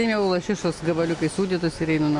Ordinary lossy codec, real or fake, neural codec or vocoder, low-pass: MP3, 48 kbps; real; none; 10.8 kHz